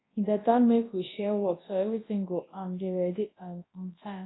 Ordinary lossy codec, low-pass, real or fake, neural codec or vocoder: AAC, 16 kbps; 7.2 kHz; fake; codec, 24 kHz, 0.9 kbps, WavTokenizer, large speech release